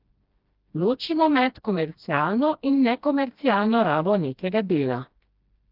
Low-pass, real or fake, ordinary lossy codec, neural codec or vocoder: 5.4 kHz; fake; Opus, 32 kbps; codec, 16 kHz, 1 kbps, FreqCodec, smaller model